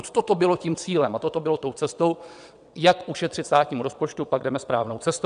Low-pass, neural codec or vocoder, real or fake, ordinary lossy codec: 9.9 kHz; vocoder, 22.05 kHz, 80 mel bands, WaveNeXt; fake; MP3, 96 kbps